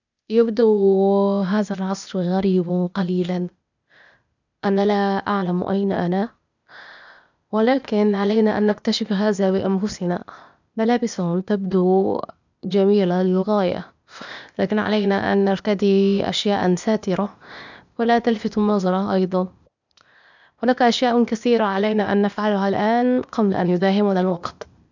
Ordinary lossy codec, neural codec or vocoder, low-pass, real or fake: none; codec, 16 kHz, 0.8 kbps, ZipCodec; 7.2 kHz; fake